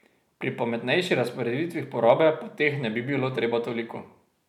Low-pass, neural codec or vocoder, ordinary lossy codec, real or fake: 19.8 kHz; none; none; real